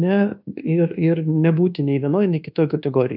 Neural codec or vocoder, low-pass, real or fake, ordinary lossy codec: codec, 24 kHz, 1.2 kbps, DualCodec; 5.4 kHz; fake; AAC, 48 kbps